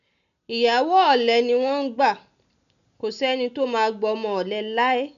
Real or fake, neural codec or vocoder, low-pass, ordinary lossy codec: real; none; 7.2 kHz; none